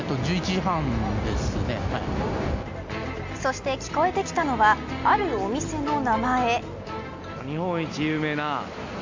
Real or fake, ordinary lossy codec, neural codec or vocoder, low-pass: real; MP3, 64 kbps; none; 7.2 kHz